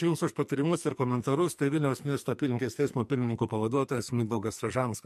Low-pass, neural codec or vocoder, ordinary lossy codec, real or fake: 14.4 kHz; codec, 44.1 kHz, 2.6 kbps, SNAC; MP3, 64 kbps; fake